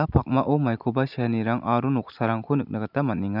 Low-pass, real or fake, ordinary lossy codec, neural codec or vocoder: 5.4 kHz; real; none; none